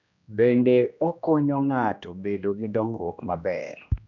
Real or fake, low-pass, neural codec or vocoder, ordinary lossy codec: fake; 7.2 kHz; codec, 16 kHz, 1 kbps, X-Codec, HuBERT features, trained on general audio; none